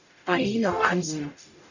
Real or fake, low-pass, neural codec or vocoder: fake; 7.2 kHz; codec, 44.1 kHz, 0.9 kbps, DAC